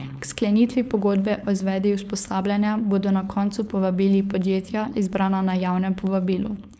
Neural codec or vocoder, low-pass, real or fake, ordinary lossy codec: codec, 16 kHz, 4.8 kbps, FACodec; none; fake; none